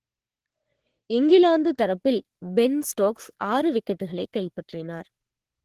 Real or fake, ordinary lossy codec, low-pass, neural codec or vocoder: fake; Opus, 16 kbps; 14.4 kHz; codec, 44.1 kHz, 3.4 kbps, Pupu-Codec